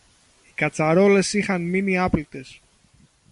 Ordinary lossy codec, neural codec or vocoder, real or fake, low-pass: MP3, 48 kbps; none; real; 14.4 kHz